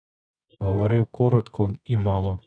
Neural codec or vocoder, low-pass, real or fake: codec, 24 kHz, 0.9 kbps, WavTokenizer, medium music audio release; 9.9 kHz; fake